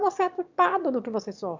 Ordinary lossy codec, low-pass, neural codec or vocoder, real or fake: MP3, 64 kbps; 7.2 kHz; autoencoder, 22.05 kHz, a latent of 192 numbers a frame, VITS, trained on one speaker; fake